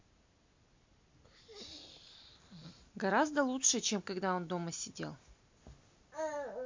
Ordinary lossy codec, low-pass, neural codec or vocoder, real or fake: MP3, 48 kbps; 7.2 kHz; none; real